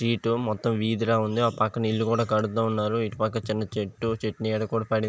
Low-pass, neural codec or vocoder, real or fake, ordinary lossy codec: none; none; real; none